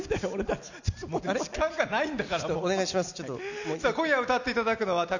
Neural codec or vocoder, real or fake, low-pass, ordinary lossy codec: none; real; 7.2 kHz; none